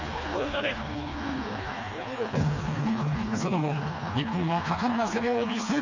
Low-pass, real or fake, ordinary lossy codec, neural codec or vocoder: 7.2 kHz; fake; MP3, 64 kbps; codec, 16 kHz, 2 kbps, FreqCodec, smaller model